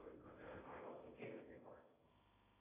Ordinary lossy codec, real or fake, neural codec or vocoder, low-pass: AAC, 16 kbps; fake; codec, 16 kHz in and 24 kHz out, 0.6 kbps, FocalCodec, streaming, 2048 codes; 3.6 kHz